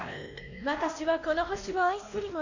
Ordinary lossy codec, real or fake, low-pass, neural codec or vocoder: none; fake; 7.2 kHz; codec, 16 kHz, 1 kbps, X-Codec, WavLM features, trained on Multilingual LibriSpeech